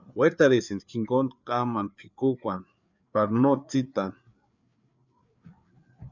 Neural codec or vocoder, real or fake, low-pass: codec, 16 kHz, 4 kbps, FreqCodec, larger model; fake; 7.2 kHz